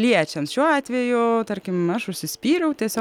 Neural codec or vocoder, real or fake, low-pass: none; real; 19.8 kHz